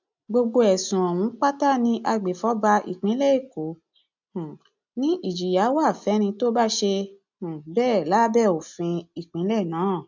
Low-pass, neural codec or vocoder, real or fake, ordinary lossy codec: 7.2 kHz; none; real; MP3, 64 kbps